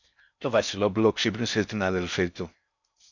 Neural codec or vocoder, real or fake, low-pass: codec, 16 kHz in and 24 kHz out, 0.6 kbps, FocalCodec, streaming, 4096 codes; fake; 7.2 kHz